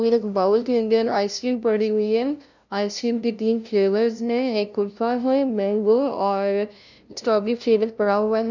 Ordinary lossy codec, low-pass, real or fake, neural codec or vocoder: none; 7.2 kHz; fake; codec, 16 kHz, 0.5 kbps, FunCodec, trained on LibriTTS, 25 frames a second